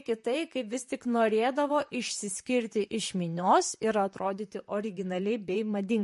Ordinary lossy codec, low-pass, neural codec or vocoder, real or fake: MP3, 48 kbps; 14.4 kHz; vocoder, 44.1 kHz, 128 mel bands, Pupu-Vocoder; fake